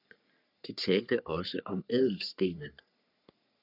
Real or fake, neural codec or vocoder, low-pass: fake; codec, 44.1 kHz, 3.4 kbps, Pupu-Codec; 5.4 kHz